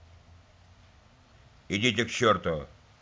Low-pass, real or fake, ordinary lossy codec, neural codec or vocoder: none; real; none; none